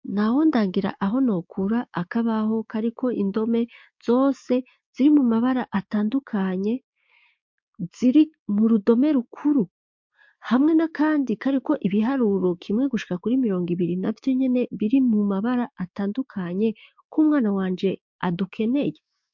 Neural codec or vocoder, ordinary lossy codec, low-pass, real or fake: codec, 16 kHz, 6 kbps, DAC; MP3, 48 kbps; 7.2 kHz; fake